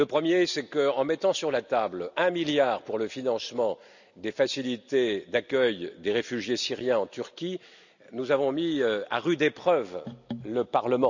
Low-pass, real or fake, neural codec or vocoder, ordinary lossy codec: 7.2 kHz; real; none; none